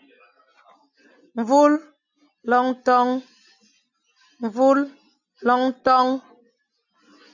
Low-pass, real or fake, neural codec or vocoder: 7.2 kHz; real; none